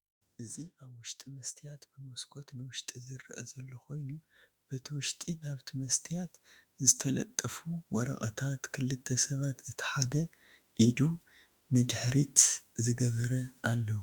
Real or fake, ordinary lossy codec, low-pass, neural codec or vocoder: fake; Opus, 64 kbps; 19.8 kHz; autoencoder, 48 kHz, 32 numbers a frame, DAC-VAE, trained on Japanese speech